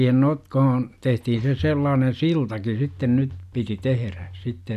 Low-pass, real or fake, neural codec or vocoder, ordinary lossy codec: 14.4 kHz; real; none; none